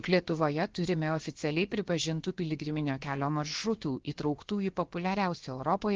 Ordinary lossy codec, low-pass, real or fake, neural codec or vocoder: Opus, 16 kbps; 7.2 kHz; fake; codec, 16 kHz, about 1 kbps, DyCAST, with the encoder's durations